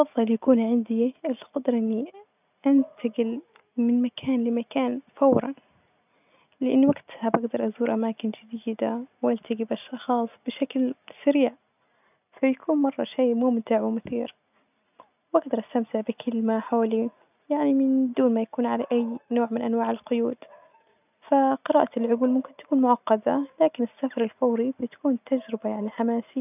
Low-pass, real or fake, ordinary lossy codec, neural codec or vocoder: 3.6 kHz; real; none; none